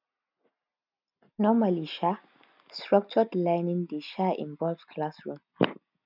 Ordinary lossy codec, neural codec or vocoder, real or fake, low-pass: none; none; real; 5.4 kHz